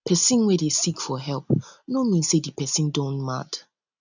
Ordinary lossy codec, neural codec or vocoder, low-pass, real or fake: none; none; 7.2 kHz; real